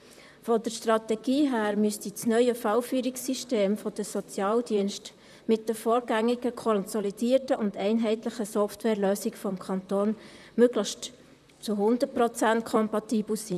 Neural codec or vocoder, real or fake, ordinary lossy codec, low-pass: vocoder, 44.1 kHz, 128 mel bands, Pupu-Vocoder; fake; none; 14.4 kHz